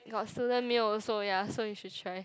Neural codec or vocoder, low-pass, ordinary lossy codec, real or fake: none; none; none; real